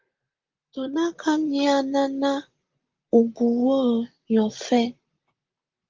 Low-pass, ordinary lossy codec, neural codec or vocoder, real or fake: 7.2 kHz; Opus, 32 kbps; vocoder, 44.1 kHz, 128 mel bands, Pupu-Vocoder; fake